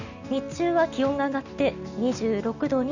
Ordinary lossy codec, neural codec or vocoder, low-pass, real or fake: none; none; 7.2 kHz; real